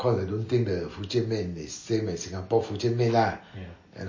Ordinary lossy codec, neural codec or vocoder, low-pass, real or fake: MP3, 32 kbps; none; 7.2 kHz; real